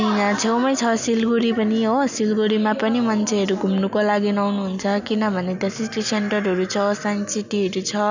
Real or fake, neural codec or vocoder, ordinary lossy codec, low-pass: real; none; none; 7.2 kHz